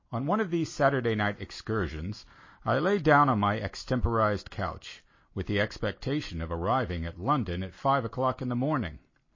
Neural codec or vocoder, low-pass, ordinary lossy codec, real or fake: none; 7.2 kHz; MP3, 32 kbps; real